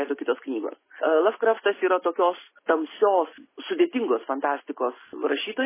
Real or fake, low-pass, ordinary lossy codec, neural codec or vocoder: real; 3.6 kHz; MP3, 16 kbps; none